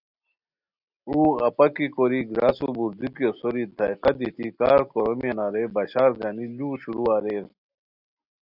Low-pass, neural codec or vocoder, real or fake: 5.4 kHz; none; real